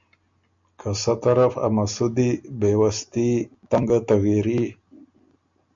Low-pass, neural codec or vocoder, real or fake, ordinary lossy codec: 7.2 kHz; none; real; MP3, 64 kbps